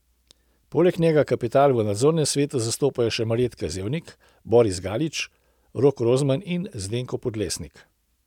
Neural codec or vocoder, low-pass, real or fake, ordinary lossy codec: none; 19.8 kHz; real; none